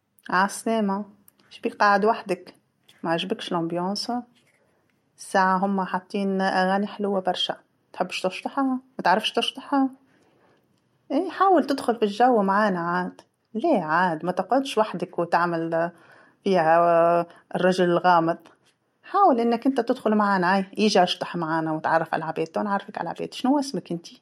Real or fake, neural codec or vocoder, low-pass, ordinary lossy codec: real; none; 19.8 kHz; MP3, 64 kbps